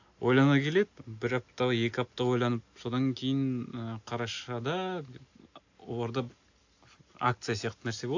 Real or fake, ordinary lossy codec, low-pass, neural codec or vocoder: real; AAC, 48 kbps; 7.2 kHz; none